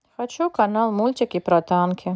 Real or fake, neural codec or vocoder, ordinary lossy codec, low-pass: real; none; none; none